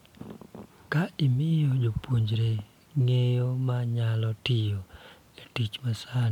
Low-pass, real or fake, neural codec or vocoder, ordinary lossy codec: 19.8 kHz; real; none; MP3, 96 kbps